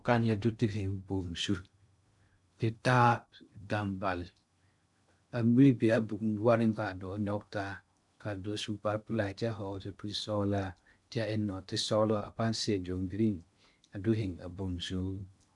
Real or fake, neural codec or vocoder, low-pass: fake; codec, 16 kHz in and 24 kHz out, 0.6 kbps, FocalCodec, streaming, 2048 codes; 10.8 kHz